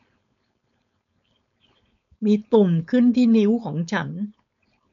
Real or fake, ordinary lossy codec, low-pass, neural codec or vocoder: fake; MP3, 96 kbps; 7.2 kHz; codec, 16 kHz, 4.8 kbps, FACodec